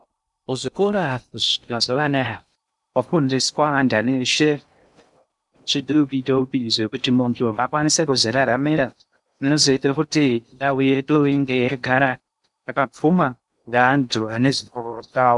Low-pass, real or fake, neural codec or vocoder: 10.8 kHz; fake; codec, 16 kHz in and 24 kHz out, 0.6 kbps, FocalCodec, streaming, 2048 codes